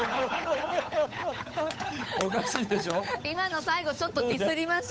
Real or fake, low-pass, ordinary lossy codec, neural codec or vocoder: fake; none; none; codec, 16 kHz, 8 kbps, FunCodec, trained on Chinese and English, 25 frames a second